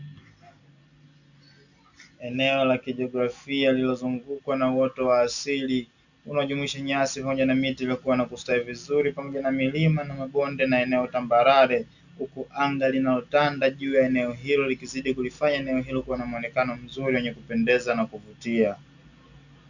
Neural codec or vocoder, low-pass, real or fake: none; 7.2 kHz; real